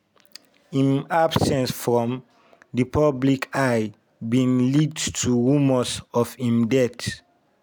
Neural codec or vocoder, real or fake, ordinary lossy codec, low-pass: none; real; none; none